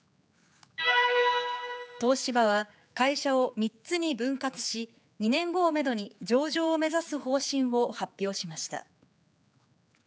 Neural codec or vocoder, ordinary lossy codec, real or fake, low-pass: codec, 16 kHz, 4 kbps, X-Codec, HuBERT features, trained on general audio; none; fake; none